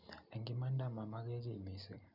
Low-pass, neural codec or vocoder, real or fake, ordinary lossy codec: 5.4 kHz; none; real; none